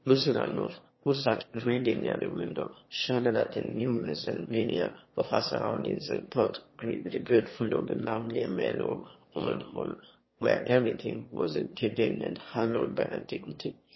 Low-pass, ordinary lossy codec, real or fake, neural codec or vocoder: 7.2 kHz; MP3, 24 kbps; fake; autoencoder, 22.05 kHz, a latent of 192 numbers a frame, VITS, trained on one speaker